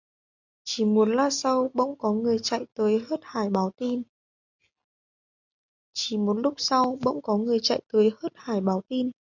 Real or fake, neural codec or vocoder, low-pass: real; none; 7.2 kHz